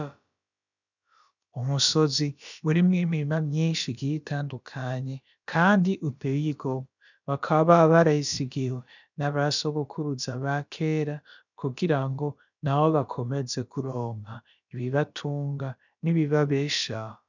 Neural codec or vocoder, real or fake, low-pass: codec, 16 kHz, about 1 kbps, DyCAST, with the encoder's durations; fake; 7.2 kHz